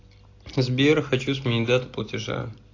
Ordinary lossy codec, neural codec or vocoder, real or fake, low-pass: AAC, 48 kbps; none; real; 7.2 kHz